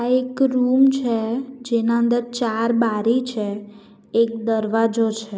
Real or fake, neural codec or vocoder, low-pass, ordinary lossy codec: real; none; none; none